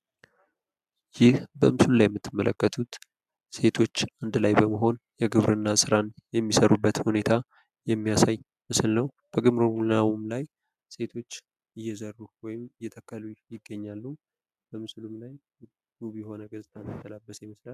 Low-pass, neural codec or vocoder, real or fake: 14.4 kHz; none; real